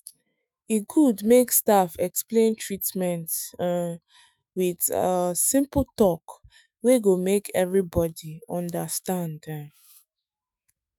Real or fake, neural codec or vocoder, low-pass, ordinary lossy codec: fake; autoencoder, 48 kHz, 128 numbers a frame, DAC-VAE, trained on Japanese speech; none; none